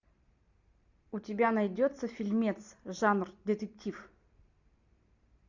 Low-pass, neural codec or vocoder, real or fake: 7.2 kHz; none; real